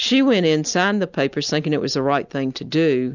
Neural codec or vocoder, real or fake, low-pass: none; real; 7.2 kHz